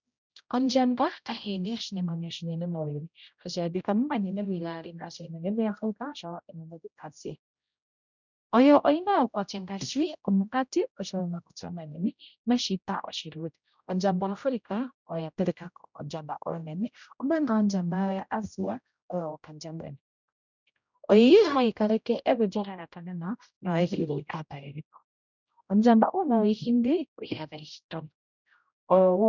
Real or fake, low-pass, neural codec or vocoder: fake; 7.2 kHz; codec, 16 kHz, 0.5 kbps, X-Codec, HuBERT features, trained on general audio